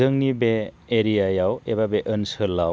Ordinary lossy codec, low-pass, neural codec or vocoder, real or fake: none; none; none; real